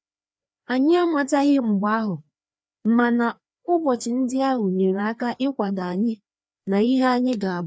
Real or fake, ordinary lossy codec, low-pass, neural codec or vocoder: fake; none; none; codec, 16 kHz, 2 kbps, FreqCodec, larger model